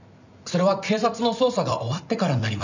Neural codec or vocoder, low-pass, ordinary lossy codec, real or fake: vocoder, 44.1 kHz, 128 mel bands every 256 samples, BigVGAN v2; 7.2 kHz; none; fake